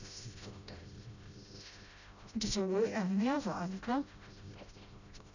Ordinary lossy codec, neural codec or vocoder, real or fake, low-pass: none; codec, 16 kHz, 0.5 kbps, FreqCodec, smaller model; fake; 7.2 kHz